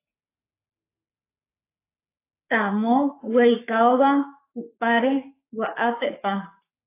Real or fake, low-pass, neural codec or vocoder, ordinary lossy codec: fake; 3.6 kHz; codec, 44.1 kHz, 3.4 kbps, Pupu-Codec; AAC, 24 kbps